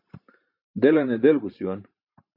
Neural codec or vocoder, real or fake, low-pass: vocoder, 44.1 kHz, 128 mel bands every 512 samples, BigVGAN v2; fake; 5.4 kHz